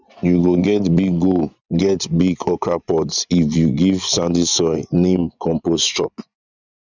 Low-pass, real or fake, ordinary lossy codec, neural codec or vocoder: 7.2 kHz; fake; none; vocoder, 24 kHz, 100 mel bands, Vocos